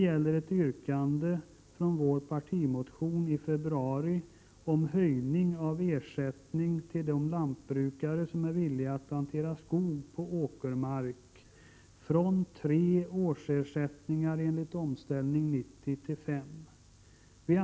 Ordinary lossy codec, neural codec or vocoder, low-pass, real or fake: none; none; none; real